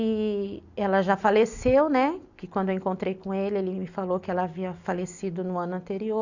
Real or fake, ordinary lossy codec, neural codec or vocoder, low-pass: real; none; none; 7.2 kHz